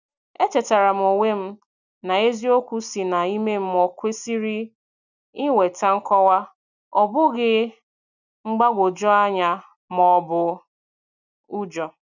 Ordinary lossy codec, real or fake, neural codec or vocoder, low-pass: none; real; none; 7.2 kHz